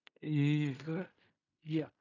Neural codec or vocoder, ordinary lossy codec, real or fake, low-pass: codec, 16 kHz in and 24 kHz out, 0.4 kbps, LongCat-Audio-Codec, fine tuned four codebook decoder; none; fake; 7.2 kHz